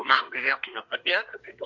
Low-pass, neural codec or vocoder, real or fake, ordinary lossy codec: 7.2 kHz; codec, 24 kHz, 1 kbps, SNAC; fake; MP3, 48 kbps